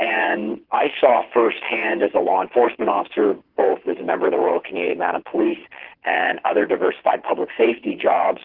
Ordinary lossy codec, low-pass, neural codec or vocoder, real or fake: Opus, 16 kbps; 5.4 kHz; vocoder, 22.05 kHz, 80 mel bands, WaveNeXt; fake